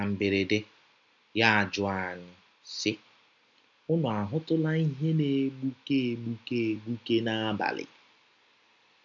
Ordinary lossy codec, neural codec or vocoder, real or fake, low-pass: none; none; real; 7.2 kHz